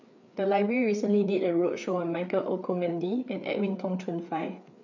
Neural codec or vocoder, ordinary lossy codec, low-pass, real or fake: codec, 16 kHz, 4 kbps, FreqCodec, larger model; none; 7.2 kHz; fake